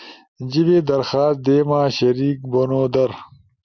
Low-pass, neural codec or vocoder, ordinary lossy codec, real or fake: 7.2 kHz; none; Opus, 64 kbps; real